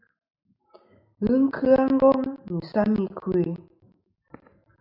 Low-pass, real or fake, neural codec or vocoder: 5.4 kHz; real; none